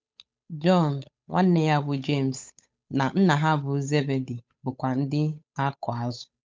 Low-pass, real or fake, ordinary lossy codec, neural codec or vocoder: none; fake; none; codec, 16 kHz, 8 kbps, FunCodec, trained on Chinese and English, 25 frames a second